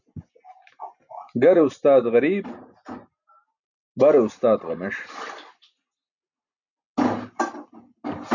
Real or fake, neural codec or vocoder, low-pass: real; none; 7.2 kHz